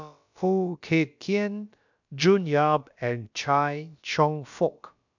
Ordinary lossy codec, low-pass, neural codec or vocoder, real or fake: none; 7.2 kHz; codec, 16 kHz, about 1 kbps, DyCAST, with the encoder's durations; fake